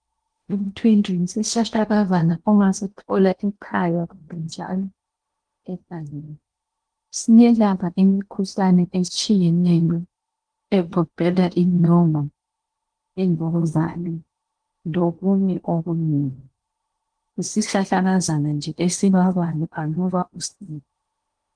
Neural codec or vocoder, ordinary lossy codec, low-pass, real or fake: codec, 16 kHz in and 24 kHz out, 0.8 kbps, FocalCodec, streaming, 65536 codes; Opus, 24 kbps; 9.9 kHz; fake